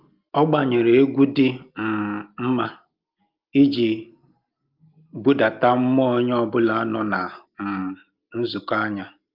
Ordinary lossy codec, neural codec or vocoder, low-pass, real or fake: Opus, 32 kbps; none; 5.4 kHz; real